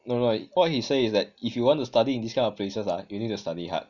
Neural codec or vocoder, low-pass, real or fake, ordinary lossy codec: none; 7.2 kHz; real; none